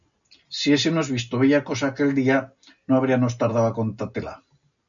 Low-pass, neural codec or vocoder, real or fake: 7.2 kHz; none; real